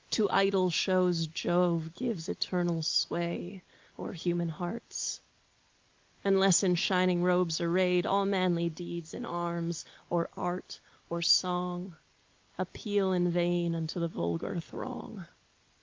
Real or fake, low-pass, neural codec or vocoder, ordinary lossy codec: real; 7.2 kHz; none; Opus, 24 kbps